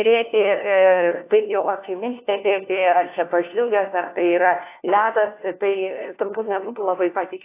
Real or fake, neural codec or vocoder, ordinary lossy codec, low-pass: fake; codec, 16 kHz, 1 kbps, FunCodec, trained on LibriTTS, 50 frames a second; AAC, 24 kbps; 3.6 kHz